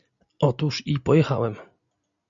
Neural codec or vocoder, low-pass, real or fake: none; 7.2 kHz; real